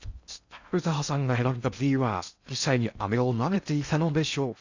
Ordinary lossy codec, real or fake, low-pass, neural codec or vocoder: Opus, 64 kbps; fake; 7.2 kHz; codec, 16 kHz in and 24 kHz out, 0.6 kbps, FocalCodec, streaming, 2048 codes